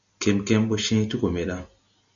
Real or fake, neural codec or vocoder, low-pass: real; none; 7.2 kHz